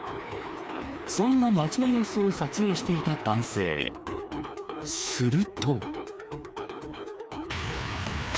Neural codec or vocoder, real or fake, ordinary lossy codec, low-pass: codec, 16 kHz, 2 kbps, FreqCodec, larger model; fake; none; none